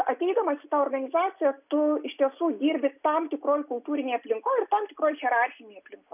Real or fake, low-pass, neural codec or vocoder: real; 3.6 kHz; none